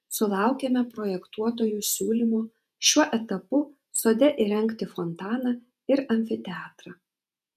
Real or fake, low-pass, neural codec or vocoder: fake; 14.4 kHz; vocoder, 48 kHz, 128 mel bands, Vocos